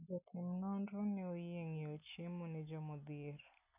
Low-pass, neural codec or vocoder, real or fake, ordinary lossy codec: 3.6 kHz; none; real; none